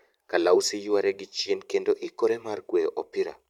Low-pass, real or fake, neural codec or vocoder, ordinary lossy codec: 19.8 kHz; fake; vocoder, 44.1 kHz, 128 mel bands every 512 samples, BigVGAN v2; none